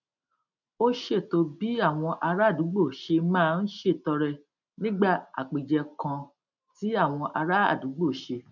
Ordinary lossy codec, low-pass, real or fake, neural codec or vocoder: none; 7.2 kHz; real; none